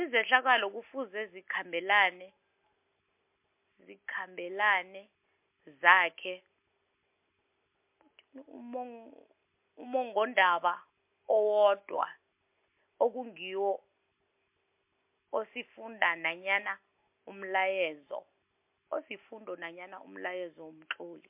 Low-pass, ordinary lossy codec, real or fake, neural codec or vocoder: 3.6 kHz; MP3, 32 kbps; real; none